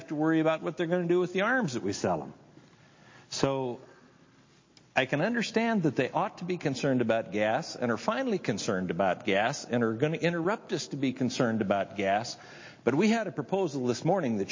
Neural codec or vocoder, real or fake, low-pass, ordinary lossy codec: none; real; 7.2 kHz; MP3, 32 kbps